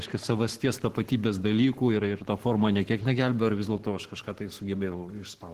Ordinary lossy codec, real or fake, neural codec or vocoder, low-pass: Opus, 16 kbps; fake; codec, 44.1 kHz, 7.8 kbps, Pupu-Codec; 14.4 kHz